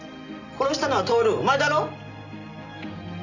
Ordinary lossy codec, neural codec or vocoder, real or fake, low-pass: none; none; real; 7.2 kHz